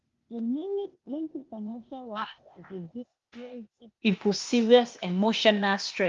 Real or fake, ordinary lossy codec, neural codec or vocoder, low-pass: fake; Opus, 32 kbps; codec, 16 kHz, 0.8 kbps, ZipCodec; 7.2 kHz